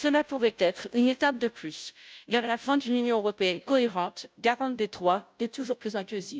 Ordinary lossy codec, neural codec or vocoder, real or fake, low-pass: none; codec, 16 kHz, 0.5 kbps, FunCodec, trained on Chinese and English, 25 frames a second; fake; none